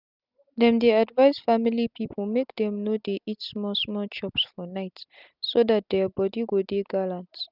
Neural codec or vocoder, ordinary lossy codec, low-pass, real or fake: none; none; 5.4 kHz; real